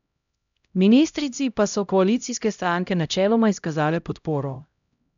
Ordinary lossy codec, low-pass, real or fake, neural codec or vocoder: none; 7.2 kHz; fake; codec, 16 kHz, 0.5 kbps, X-Codec, HuBERT features, trained on LibriSpeech